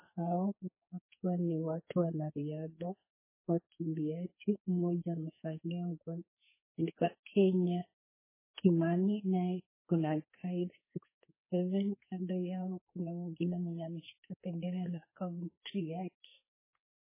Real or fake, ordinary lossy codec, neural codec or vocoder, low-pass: fake; MP3, 16 kbps; codec, 44.1 kHz, 2.6 kbps, SNAC; 3.6 kHz